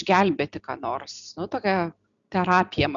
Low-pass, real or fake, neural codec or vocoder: 7.2 kHz; real; none